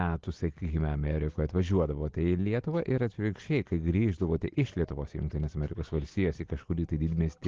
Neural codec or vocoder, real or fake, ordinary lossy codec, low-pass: none; real; Opus, 16 kbps; 7.2 kHz